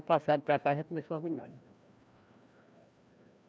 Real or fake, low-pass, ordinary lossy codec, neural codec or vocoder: fake; none; none; codec, 16 kHz, 1 kbps, FreqCodec, larger model